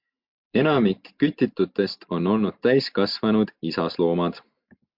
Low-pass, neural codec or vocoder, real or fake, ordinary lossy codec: 5.4 kHz; none; real; MP3, 48 kbps